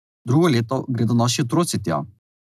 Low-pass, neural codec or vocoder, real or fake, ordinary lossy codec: 14.4 kHz; none; real; none